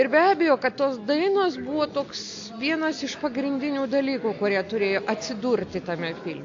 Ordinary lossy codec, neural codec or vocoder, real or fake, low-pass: AAC, 48 kbps; none; real; 7.2 kHz